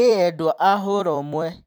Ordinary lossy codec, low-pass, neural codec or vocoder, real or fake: none; none; vocoder, 44.1 kHz, 128 mel bands every 256 samples, BigVGAN v2; fake